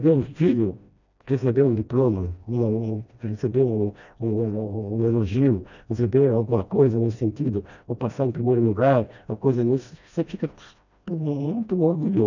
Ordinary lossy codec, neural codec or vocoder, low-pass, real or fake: none; codec, 16 kHz, 1 kbps, FreqCodec, smaller model; 7.2 kHz; fake